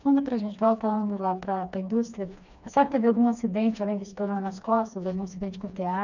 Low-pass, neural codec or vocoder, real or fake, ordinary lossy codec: 7.2 kHz; codec, 16 kHz, 2 kbps, FreqCodec, smaller model; fake; none